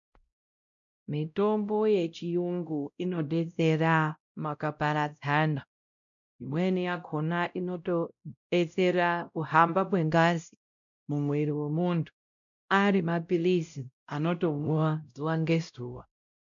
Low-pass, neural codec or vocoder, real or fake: 7.2 kHz; codec, 16 kHz, 0.5 kbps, X-Codec, WavLM features, trained on Multilingual LibriSpeech; fake